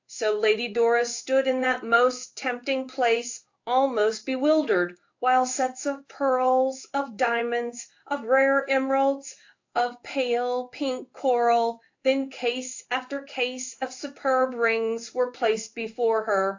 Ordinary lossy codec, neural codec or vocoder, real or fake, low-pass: AAC, 48 kbps; codec, 16 kHz in and 24 kHz out, 1 kbps, XY-Tokenizer; fake; 7.2 kHz